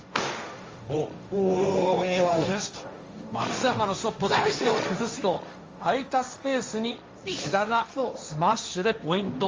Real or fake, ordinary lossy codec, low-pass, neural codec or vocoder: fake; Opus, 32 kbps; 7.2 kHz; codec, 16 kHz, 1.1 kbps, Voila-Tokenizer